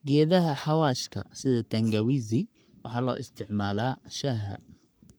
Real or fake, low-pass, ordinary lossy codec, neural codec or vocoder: fake; none; none; codec, 44.1 kHz, 3.4 kbps, Pupu-Codec